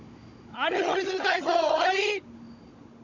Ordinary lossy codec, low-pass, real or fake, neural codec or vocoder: MP3, 64 kbps; 7.2 kHz; fake; codec, 16 kHz, 16 kbps, FunCodec, trained on Chinese and English, 50 frames a second